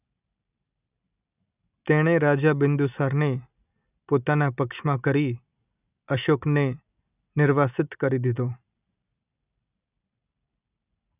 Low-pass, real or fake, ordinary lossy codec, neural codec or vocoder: 3.6 kHz; real; none; none